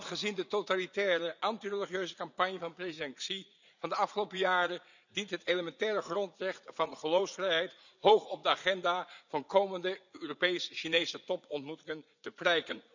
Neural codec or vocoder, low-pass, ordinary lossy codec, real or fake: vocoder, 44.1 kHz, 80 mel bands, Vocos; 7.2 kHz; none; fake